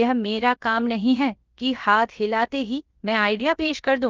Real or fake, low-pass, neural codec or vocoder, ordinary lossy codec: fake; 7.2 kHz; codec, 16 kHz, about 1 kbps, DyCAST, with the encoder's durations; Opus, 32 kbps